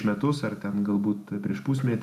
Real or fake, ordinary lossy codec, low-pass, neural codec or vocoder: real; AAC, 96 kbps; 14.4 kHz; none